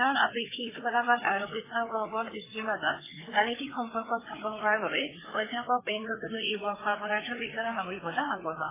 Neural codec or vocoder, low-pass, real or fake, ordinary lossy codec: codec, 16 kHz, 4 kbps, FreqCodec, larger model; 3.6 kHz; fake; AAC, 16 kbps